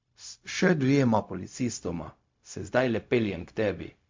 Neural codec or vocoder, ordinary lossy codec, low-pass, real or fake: codec, 16 kHz, 0.4 kbps, LongCat-Audio-Codec; MP3, 48 kbps; 7.2 kHz; fake